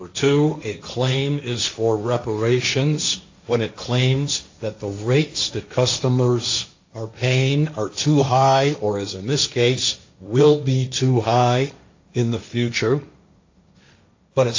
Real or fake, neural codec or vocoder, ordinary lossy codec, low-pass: fake; codec, 16 kHz, 1.1 kbps, Voila-Tokenizer; AAC, 48 kbps; 7.2 kHz